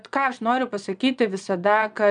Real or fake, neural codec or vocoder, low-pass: fake; vocoder, 22.05 kHz, 80 mel bands, Vocos; 9.9 kHz